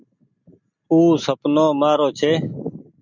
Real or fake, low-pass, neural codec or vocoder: real; 7.2 kHz; none